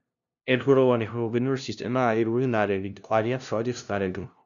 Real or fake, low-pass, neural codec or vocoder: fake; 7.2 kHz; codec, 16 kHz, 0.5 kbps, FunCodec, trained on LibriTTS, 25 frames a second